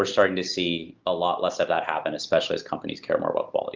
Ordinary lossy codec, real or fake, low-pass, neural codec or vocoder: Opus, 32 kbps; real; 7.2 kHz; none